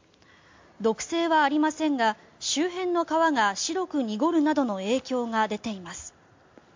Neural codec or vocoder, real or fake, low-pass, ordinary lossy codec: none; real; 7.2 kHz; MP3, 48 kbps